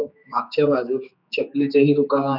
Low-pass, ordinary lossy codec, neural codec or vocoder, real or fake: 5.4 kHz; none; codec, 16 kHz, 4 kbps, X-Codec, HuBERT features, trained on general audio; fake